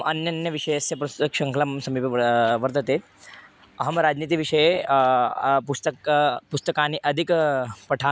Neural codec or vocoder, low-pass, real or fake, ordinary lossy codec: none; none; real; none